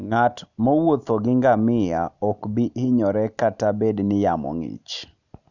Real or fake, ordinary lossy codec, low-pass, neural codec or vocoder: real; none; 7.2 kHz; none